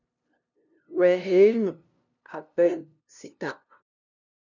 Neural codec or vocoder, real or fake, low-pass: codec, 16 kHz, 0.5 kbps, FunCodec, trained on LibriTTS, 25 frames a second; fake; 7.2 kHz